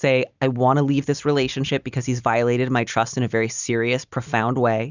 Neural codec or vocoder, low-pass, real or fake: none; 7.2 kHz; real